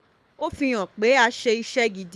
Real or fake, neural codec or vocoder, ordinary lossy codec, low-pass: fake; codec, 24 kHz, 6 kbps, HILCodec; none; none